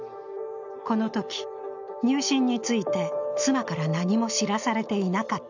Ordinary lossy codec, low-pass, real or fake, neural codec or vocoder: none; 7.2 kHz; real; none